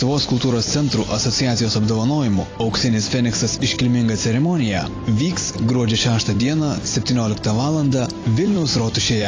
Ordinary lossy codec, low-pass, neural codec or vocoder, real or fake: AAC, 32 kbps; 7.2 kHz; vocoder, 44.1 kHz, 128 mel bands every 256 samples, BigVGAN v2; fake